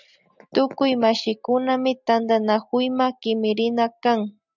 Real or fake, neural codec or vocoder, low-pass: real; none; 7.2 kHz